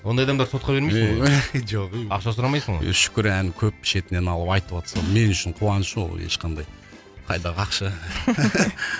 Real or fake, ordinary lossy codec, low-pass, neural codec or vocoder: real; none; none; none